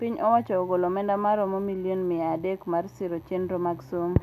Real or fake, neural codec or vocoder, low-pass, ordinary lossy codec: real; none; 14.4 kHz; none